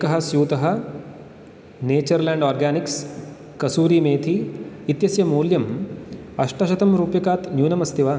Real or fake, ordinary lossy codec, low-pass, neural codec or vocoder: real; none; none; none